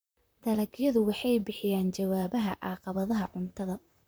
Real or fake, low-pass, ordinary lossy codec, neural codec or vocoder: fake; none; none; vocoder, 44.1 kHz, 128 mel bands, Pupu-Vocoder